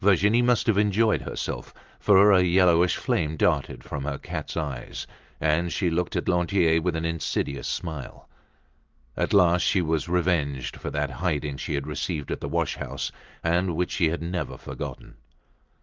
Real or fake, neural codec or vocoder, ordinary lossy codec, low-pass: real; none; Opus, 32 kbps; 7.2 kHz